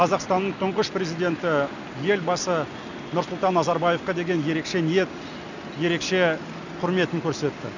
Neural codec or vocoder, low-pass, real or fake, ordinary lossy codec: none; 7.2 kHz; real; none